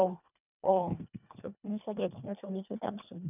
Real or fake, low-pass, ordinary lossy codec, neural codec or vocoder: fake; 3.6 kHz; none; codec, 24 kHz, 1.5 kbps, HILCodec